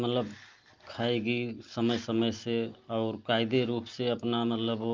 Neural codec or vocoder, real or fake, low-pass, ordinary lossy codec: none; real; 7.2 kHz; Opus, 32 kbps